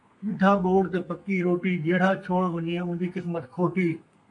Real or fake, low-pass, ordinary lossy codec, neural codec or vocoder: fake; 10.8 kHz; MP3, 64 kbps; codec, 32 kHz, 1.9 kbps, SNAC